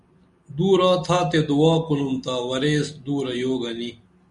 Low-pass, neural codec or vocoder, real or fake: 10.8 kHz; none; real